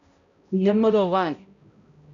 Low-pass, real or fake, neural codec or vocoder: 7.2 kHz; fake; codec, 16 kHz, 0.5 kbps, X-Codec, HuBERT features, trained on balanced general audio